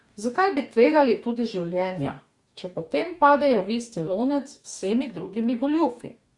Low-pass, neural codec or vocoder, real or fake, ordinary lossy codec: 10.8 kHz; codec, 44.1 kHz, 2.6 kbps, DAC; fake; Opus, 64 kbps